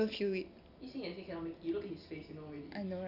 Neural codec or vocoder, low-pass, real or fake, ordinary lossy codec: none; 5.4 kHz; real; none